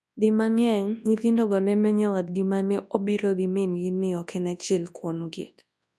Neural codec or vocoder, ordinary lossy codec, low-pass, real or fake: codec, 24 kHz, 0.9 kbps, WavTokenizer, large speech release; none; none; fake